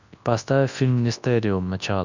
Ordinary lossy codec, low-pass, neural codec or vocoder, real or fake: Opus, 64 kbps; 7.2 kHz; codec, 24 kHz, 0.9 kbps, WavTokenizer, large speech release; fake